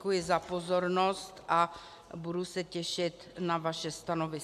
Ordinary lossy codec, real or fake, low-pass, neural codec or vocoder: AAC, 96 kbps; real; 14.4 kHz; none